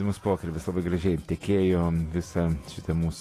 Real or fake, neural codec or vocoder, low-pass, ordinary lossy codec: fake; vocoder, 48 kHz, 128 mel bands, Vocos; 14.4 kHz; AAC, 48 kbps